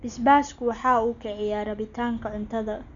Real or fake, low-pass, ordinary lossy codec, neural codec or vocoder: real; 7.2 kHz; none; none